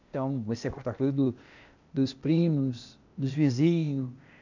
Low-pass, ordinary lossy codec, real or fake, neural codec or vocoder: 7.2 kHz; none; fake; codec, 16 kHz, 0.8 kbps, ZipCodec